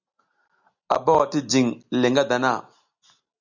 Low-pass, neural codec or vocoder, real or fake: 7.2 kHz; none; real